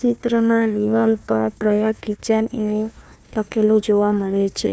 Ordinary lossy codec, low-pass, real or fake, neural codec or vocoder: none; none; fake; codec, 16 kHz, 1 kbps, FunCodec, trained on Chinese and English, 50 frames a second